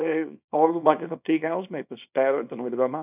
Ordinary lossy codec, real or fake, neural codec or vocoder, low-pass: none; fake; codec, 24 kHz, 0.9 kbps, WavTokenizer, small release; 3.6 kHz